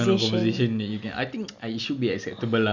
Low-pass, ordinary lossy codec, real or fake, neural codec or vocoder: 7.2 kHz; none; real; none